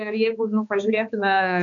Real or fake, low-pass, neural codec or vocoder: fake; 7.2 kHz; codec, 16 kHz, 2 kbps, X-Codec, HuBERT features, trained on balanced general audio